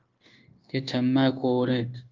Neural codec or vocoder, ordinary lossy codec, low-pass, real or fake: codec, 16 kHz, 0.9 kbps, LongCat-Audio-Codec; Opus, 32 kbps; 7.2 kHz; fake